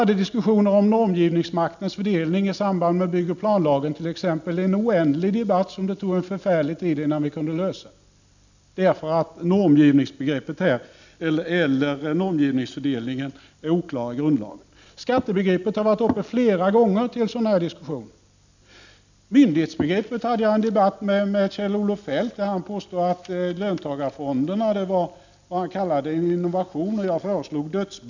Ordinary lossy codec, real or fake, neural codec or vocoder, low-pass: none; real; none; 7.2 kHz